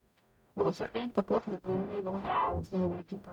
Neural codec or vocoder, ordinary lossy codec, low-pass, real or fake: codec, 44.1 kHz, 0.9 kbps, DAC; none; 19.8 kHz; fake